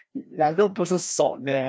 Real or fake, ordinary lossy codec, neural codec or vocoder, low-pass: fake; none; codec, 16 kHz, 1 kbps, FreqCodec, larger model; none